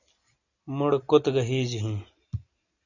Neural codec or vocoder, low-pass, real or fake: none; 7.2 kHz; real